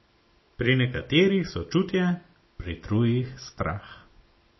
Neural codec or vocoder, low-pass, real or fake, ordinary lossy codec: none; 7.2 kHz; real; MP3, 24 kbps